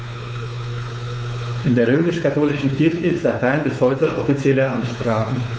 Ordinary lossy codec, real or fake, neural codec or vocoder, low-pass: none; fake; codec, 16 kHz, 4 kbps, X-Codec, WavLM features, trained on Multilingual LibriSpeech; none